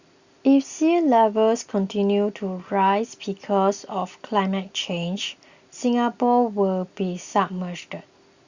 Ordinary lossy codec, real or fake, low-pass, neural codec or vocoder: Opus, 64 kbps; real; 7.2 kHz; none